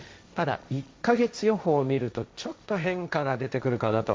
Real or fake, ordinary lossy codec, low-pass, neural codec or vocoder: fake; none; none; codec, 16 kHz, 1.1 kbps, Voila-Tokenizer